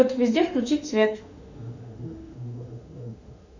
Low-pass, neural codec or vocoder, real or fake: 7.2 kHz; autoencoder, 48 kHz, 32 numbers a frame, DAC-VAE, trained on Japanese speech; fake